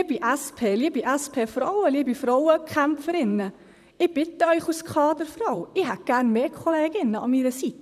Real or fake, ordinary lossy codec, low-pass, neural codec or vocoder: fake; none; 14.4 kHz; vocoder, 44.1 kHz, 128 mel bands, Pupu-Vocoder